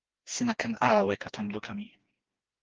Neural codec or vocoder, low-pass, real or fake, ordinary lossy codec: codec, 16 kHz, 2 kbps, FreqCodec, smaller model; 7.2 kHz; fake; Opus, 16 kbps